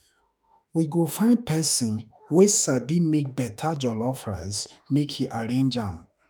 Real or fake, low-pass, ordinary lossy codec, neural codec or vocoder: fake; none; none; autoencoder, 48 kHz, 32 numbers a frame, DAC-VAE, trained on Japanese speech